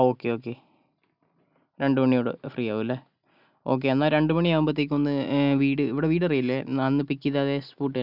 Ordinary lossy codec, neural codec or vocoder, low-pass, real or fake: none; none; 5.4 kHz; real